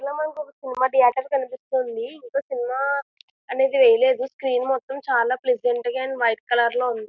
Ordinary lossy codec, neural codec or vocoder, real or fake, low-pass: none; none; real; 7.2 kHz